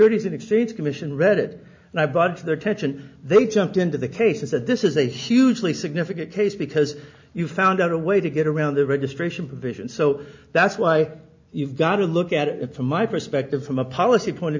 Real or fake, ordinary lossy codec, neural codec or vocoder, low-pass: real; MP3, 64 kbps; none; 7.2 kHz